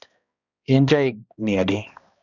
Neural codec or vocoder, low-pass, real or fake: codec, 16 kHz, 1 kbps, X-Codec, HuBERT features, trained on balanced general audio; 7.2 kHz; fake